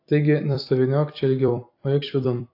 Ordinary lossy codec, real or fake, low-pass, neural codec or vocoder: AAC, 32 kbps; fake; 5.4 kHz; vocoder, 44.1 kHz, 128 mel bands every 256 samples, BigVGAN v2